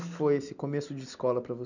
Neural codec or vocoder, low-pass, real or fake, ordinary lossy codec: none; 7.2 kHz; real; none